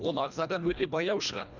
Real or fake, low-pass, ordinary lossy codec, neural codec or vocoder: fake; 7.2 kHz; none; codec, 24 kHz, 1.5 kbps, HILCodec